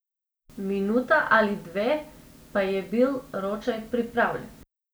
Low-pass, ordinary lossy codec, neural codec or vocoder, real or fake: none; none; none; real